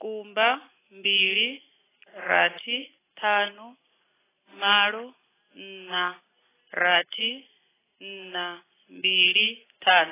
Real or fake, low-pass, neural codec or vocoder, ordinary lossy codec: real; 3.6 kHz; none; AAC, 16 kbps